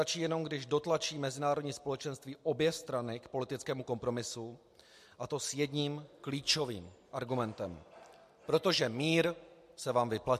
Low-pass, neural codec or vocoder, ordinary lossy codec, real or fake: 14.4 kHz; none; MP3, 64 kbps; real